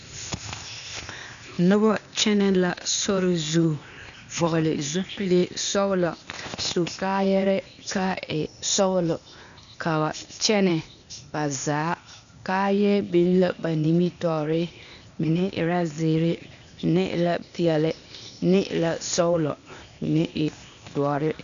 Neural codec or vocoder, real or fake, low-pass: codec, 16 kHz, 0.8 kbps, ZipCodec; fake; 7.2 kHz